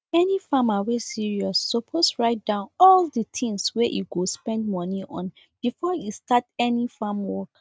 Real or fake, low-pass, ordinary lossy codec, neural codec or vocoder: real; none; none; none